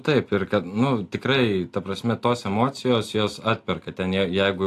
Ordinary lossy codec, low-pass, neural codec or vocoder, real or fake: AAC, 64 kbps; 14.4 kHz; none; real